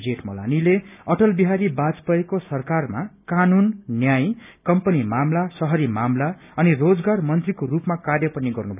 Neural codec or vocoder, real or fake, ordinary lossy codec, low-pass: none; real; none; 3.6 kHz